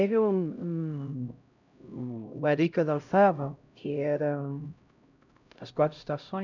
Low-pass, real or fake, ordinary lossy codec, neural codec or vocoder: 7.2 kHz; fake; none; codec, 16 kHz, 0.5 kbps, X-Codec, HuBERT features, trained on LibriSpeech